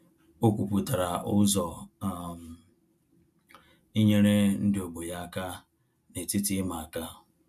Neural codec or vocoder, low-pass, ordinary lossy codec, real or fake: none; 14.4 kHz; none; real